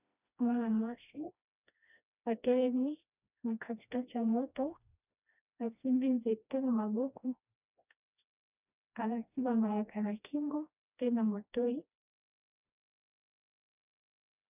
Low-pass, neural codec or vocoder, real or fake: 3.6 kHz; codec, 16 kHz, 1 kbps, FreqCodec, smaller model; fake